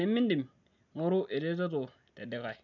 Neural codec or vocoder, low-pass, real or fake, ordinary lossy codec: none; 7.2 kHz; real; none